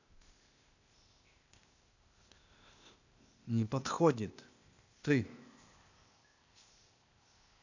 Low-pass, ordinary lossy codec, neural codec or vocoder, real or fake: 7.2 kHz; none; codec, 16 kHz, 0.8 kbps, ZipCodec; fake